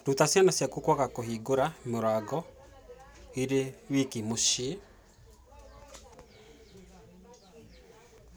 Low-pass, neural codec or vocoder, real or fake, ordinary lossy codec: none; none; real; none